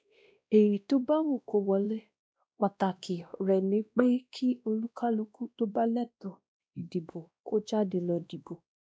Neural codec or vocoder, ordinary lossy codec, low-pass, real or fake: codec, 16 kHz, 1 kbps, X-Codec, WavLM features, trained on Multilingual LibriSpeech; none; none; fake